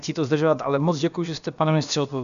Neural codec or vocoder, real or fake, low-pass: codec, 16 kHz, about 1 kbps, DyCAST, with the encoder's durations; fake; 7.2 kHz